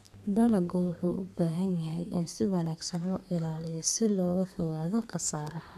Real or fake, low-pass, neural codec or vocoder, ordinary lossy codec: fake; 14.4 kHz; codec, 32 kHz, 1.9 kbps, SNAC; none